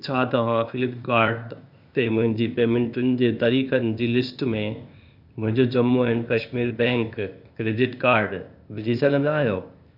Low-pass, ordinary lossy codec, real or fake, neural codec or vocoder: 5.4 kHz; none; fake; codec, 16 kHz, 0.8 kbps, ZipCodec